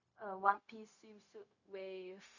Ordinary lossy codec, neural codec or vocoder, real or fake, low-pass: none; codec, 16 kHz, 0.4 kbps, LongCat-Audio-Codec; fake; 7.2 kHz